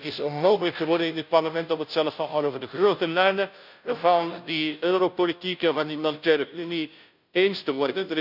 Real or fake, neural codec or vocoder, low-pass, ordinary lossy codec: fake; codec, 16 kHz, 0.5 kbps, FunCodec, trained on Chinese and English, 25 frames a second; 5.4 kHz; none